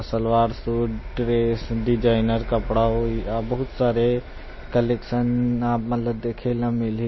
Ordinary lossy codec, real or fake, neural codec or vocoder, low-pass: MP3, 24 kbps; fake; vocoder, 44.1 kHz, 128 mel bands every 256 samples, BigVGAN v2; 7.2 kHz